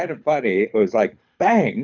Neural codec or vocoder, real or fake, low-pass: codec, 24 kHz, 6 kbps, HILCodec; fake; 7.2 kHz